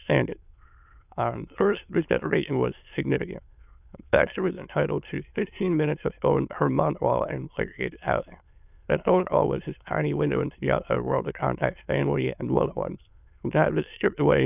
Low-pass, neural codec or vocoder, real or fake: 3.6 kHz; autoencoder, 22.05 kHz, a latent of 192 numbers a frame, VITS, trained on many speakers; fake